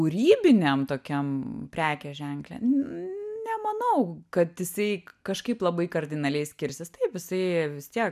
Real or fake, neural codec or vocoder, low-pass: real; none; 14.4 kHz